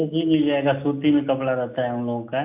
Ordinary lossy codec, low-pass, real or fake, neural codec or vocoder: AAC, 32 kbps; 3.6 kHz; real; none